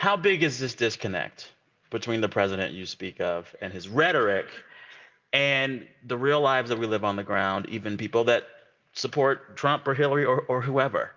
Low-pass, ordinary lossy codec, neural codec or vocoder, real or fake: 7.2 kHz; Opus, 24 kbps; none; real